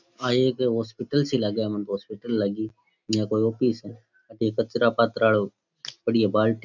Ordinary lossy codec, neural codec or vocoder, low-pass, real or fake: none; none; 7.2 kHz; real